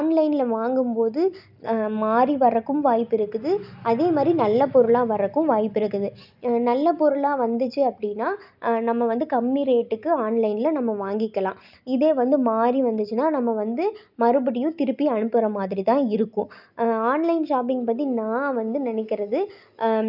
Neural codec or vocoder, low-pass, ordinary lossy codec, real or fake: none; 5.4 kHz; none; real